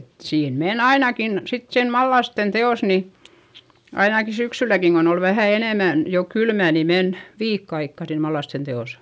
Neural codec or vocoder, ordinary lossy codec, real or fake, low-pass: none; none; real; none